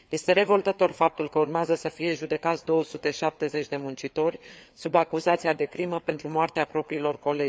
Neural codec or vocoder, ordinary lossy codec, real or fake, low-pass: codec, 16 kHz, 4 kbps, FreqCodec, larger model; none; fake; none